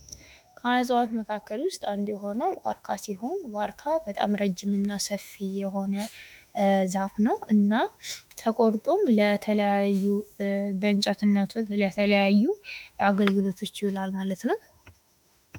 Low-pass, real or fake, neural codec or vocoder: 19.8 kHz; fake; autoencoder, 48 kHz, 32 numbers a frame, DAC-VAE, trained on Japanese speech